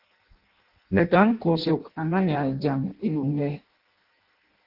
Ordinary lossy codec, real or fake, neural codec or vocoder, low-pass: Opus, 16 kbps; fake; codec, 16 kHz in and 24 kHz out, 0.6 kbps, FireRedTTS-2 codec; 5.4 kHz